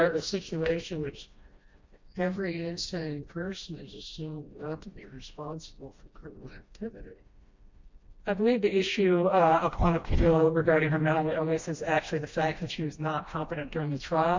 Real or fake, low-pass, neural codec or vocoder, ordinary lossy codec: fake; 7.2 kHz; codec, 16 kHz, 1 kbps, FreqCodec, smaller model; MP3, 48 kbps